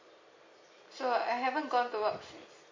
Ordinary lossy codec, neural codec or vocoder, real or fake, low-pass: MP3, 32 kbps; none; real; 7.2 kHz